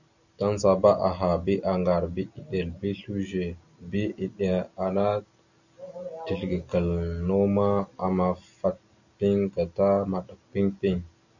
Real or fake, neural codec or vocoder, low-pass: real; none; 7.2 kHz